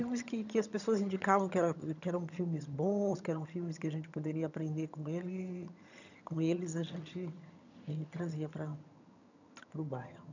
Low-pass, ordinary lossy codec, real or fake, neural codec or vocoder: 7.2 kHz; none; fake; vocoder, 22.05 kHz, 80 mel bands, HiFi-GAN